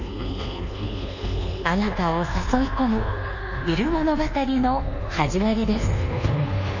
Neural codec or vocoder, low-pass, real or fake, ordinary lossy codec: codec, 24 kHz, 1.2 kbps, DualCodec; 7.2 kHz; fake; none